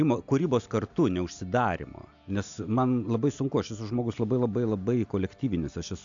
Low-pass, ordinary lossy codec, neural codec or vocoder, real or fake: 7.2 kHz; AAC, 64 kbps; none; real